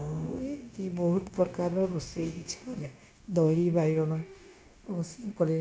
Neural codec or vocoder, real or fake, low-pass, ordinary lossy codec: codec, 16 kHz, 0.9 kbps, LongCat-Audio-Codec; fake; none; none